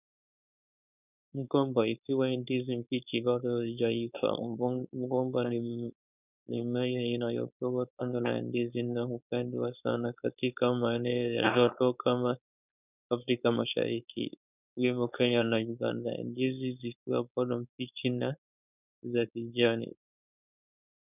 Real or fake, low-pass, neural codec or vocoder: fake; 3.6 kHz; codec, 16 kHz, 4.8 kbps, FACodec